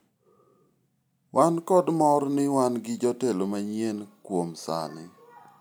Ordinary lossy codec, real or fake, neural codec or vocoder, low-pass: none; real; none; none